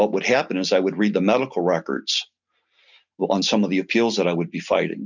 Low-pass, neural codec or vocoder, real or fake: 7.2 kHz; none; real